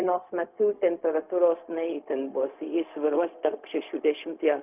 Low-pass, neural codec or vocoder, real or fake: 3.6 kHz; codec, 16 kHz, 0.4 kbps, LongCat-Audio-Codec; fake